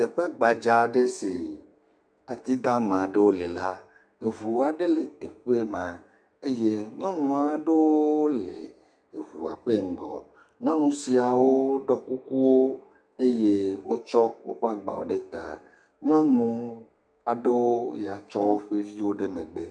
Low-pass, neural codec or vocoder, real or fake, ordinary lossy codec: 9.9 kHz; codec, 32 kHz, 1.9 kbps, SNAC; fake; MP3, 96 kbps